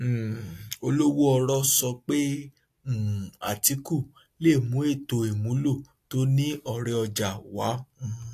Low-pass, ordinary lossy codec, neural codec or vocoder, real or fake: 14.4 kHz; AAC, 64 kbps; none; real